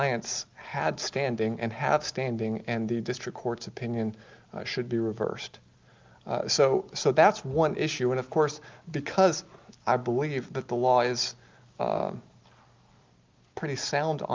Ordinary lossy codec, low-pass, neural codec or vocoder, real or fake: Opus, 24 kbps; 7.2 kHz; none; real